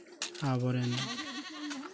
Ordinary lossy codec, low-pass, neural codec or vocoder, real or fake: none; none; none; real